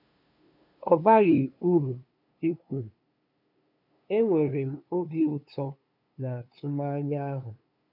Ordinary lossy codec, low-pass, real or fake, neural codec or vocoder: none; 5.4 kHz; fake; codec, 16 kHz, 2 kbps, FunCodec, trained on LibriTTS, 25 frames a second